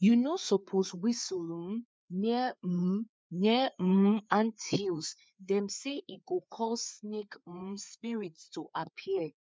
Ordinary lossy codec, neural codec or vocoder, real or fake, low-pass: none; codec, 16 kHz, 4 kbps, FreqCodec, larger model; fake; none